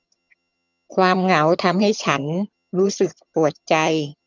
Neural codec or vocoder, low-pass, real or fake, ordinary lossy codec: vocoder, 22.05 kHz, 80 mel bands, HiFi-GAN; 7.2 kHz; fake; none